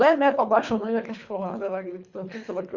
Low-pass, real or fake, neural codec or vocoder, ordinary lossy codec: 7.2 kHz; fake; codec, 24 kHz, 3 kbps, HILCodec; Opus, 64 kbps